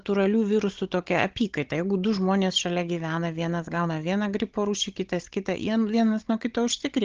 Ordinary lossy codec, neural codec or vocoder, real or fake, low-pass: Opus, 16 kbps; codec, 16 kHz, 16 kbps, FunCodec, trained on Chinese and English, 50 frames a second; fake; 7.2 kHz